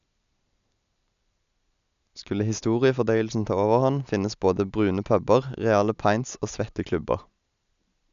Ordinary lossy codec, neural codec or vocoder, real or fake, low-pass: none; none; real; 7.2 kHz